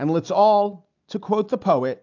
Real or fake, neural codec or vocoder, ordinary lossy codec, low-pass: real; none; AAC, 48 kbps; 7.2 kHz